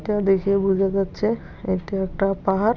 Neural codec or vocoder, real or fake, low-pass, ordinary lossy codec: none; real; 7.2 kHz; none